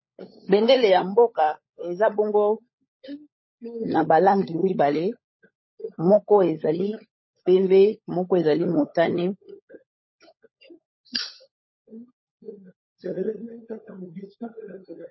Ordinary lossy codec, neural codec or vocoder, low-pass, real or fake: MP3, 24 kbps; codec, 16 kHz, 16 kbps, FunCodec, trained on LibriTTS, 50 frames a second; 7.2 kHz; fake